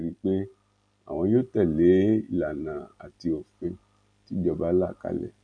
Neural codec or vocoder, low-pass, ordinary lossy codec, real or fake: none; 9.9 kHz; none; real